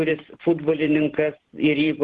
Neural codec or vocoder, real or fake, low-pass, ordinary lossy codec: vocoder, 44.1 kHz, 128 mel bands every 512 samples, BigVGAN v2; fake; 10.8 kHz; Opus, 16 kbps